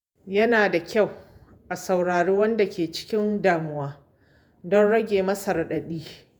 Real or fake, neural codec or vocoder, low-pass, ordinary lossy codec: fake; vocoder, 48 kHz, 128 mel bands, Vocos; none; none